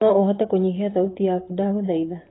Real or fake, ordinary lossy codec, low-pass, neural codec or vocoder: fake; AAC, 16 kbps; 7.2 kHz; vocoder, 44.1 kHz, 128 mel bands, Pupu-Vocoder